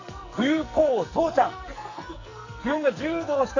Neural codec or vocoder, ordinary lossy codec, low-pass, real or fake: codec, 44.1 kHz, 2.6 kbps, SNAC; AAC, 48 kbps; 7.2 kHz; fake